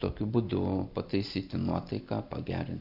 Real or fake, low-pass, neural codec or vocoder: real; 5.4 kHz; none